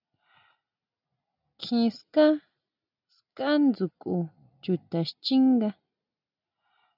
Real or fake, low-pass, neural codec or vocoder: real; 5.4 kHz; none